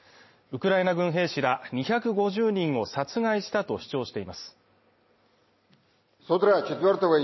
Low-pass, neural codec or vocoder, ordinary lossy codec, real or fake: 7.2 kHz; none; MP3, 24 kbps; real